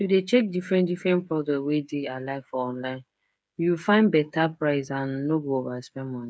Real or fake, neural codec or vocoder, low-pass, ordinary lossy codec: fake; codec, 16 kHz, 8 kbps, FreqCodec, smaller model; none; none